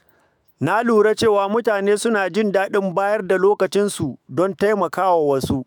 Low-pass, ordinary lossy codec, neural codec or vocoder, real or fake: none; none; autoencoder, 48 kHz, 128 numbers a frame, DAC-VAE, trained on Japanese speech; fake